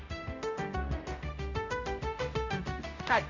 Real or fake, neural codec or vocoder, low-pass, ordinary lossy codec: fake; codec, 16 kHz, 0.5 kbps, X-Codec, HuBERT features, trained on general audio; 7.2 kHz; none